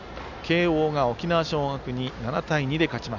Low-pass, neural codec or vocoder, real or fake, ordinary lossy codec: 7.2 kHz; none; real; none